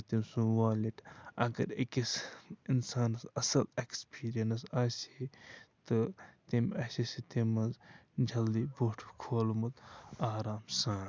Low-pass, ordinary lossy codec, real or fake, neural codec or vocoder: none; none; real; none